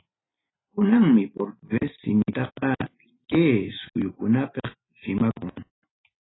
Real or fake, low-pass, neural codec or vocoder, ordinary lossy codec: real; 7.2 kHz; none; AAC, 16 kbps